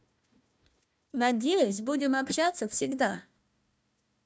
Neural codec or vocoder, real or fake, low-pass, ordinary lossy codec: codec, 16 kHz, 1 kbps, FunCodec, trained on Chinese and English, 50 frames a second; fake; none; none